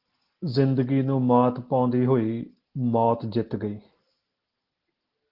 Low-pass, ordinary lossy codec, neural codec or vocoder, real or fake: 5.4 kHz; Opus, 16 kbps; none; real